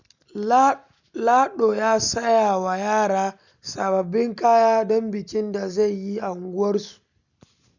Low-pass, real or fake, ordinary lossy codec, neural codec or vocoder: 7.2 kHz; real; none; none